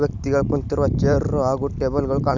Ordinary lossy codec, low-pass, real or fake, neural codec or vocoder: none; 7.2 kHz; real; none